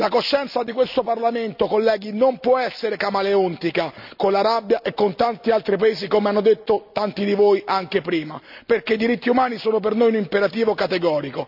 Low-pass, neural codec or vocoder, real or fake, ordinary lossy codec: 5.4 kHz; none; real; none